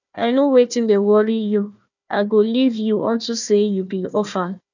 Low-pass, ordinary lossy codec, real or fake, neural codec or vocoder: 7.2 kHz; none; fake; codec, 16 kHz, 1 kbps, FunCodec, trained on Chinese and English, 50 frames a second